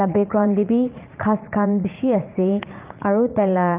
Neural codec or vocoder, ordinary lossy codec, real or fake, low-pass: vocoder, 44.1 kHz, 80 mel bands, Vocos; Opus, 32 kbps; fake; 3.6 kHz